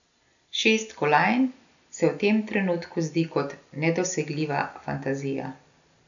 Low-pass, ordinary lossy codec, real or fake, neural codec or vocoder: 7.2 kHz; none; real; none